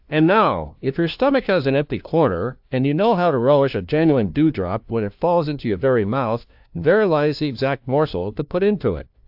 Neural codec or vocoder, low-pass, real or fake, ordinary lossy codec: codec, 16 kHz, 1 kbps, FunCodec, trained on LibriTTS, 50 frames a second; 5.4 kHz; fake; AAC, 48 kbps